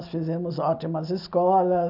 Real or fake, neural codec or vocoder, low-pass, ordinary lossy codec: real; none; 5.4 kHz; none